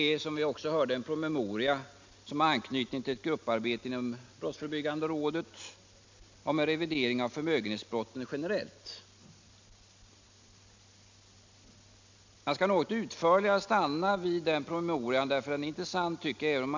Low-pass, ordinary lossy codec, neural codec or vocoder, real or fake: 7.2 kHz; MP3, 64 kbps; none; real